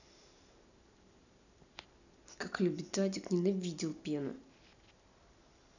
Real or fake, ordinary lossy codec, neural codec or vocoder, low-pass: real; none; none; 7.2 kHz